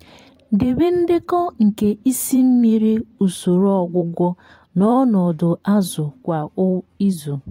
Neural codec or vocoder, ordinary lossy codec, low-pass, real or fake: vocoder, 44.1 kHz, 128 mel bands every 512 samples, BigVGAN v2; AAC, 48 kbps; 19.8 kHz; fake